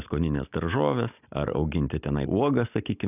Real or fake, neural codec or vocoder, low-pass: real; none; 3.6 kHz